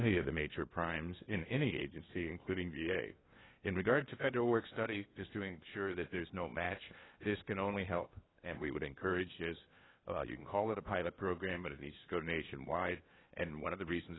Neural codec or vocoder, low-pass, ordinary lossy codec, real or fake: codec, 16 kHz in and 24 kHz out, 0.8 kbps, FocalCodec, streaming, 65536 codes; 7.2 kHz; AAC, 16 kbps; fake